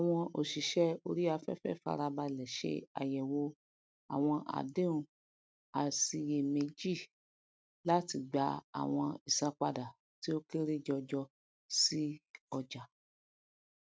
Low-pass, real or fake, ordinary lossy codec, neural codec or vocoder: none; real; none; none